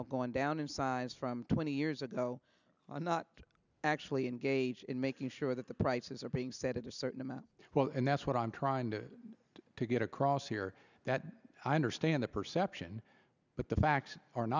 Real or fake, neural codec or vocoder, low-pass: real; none; 7.2 kHz